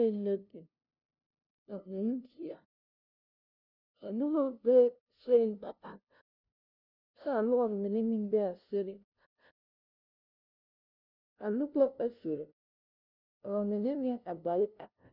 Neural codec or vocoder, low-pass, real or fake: codec, 16 kHz, 0.5 kbps, FunCodec, trained on LibriTTS, 25 frames a second; 5.4 kHz; fake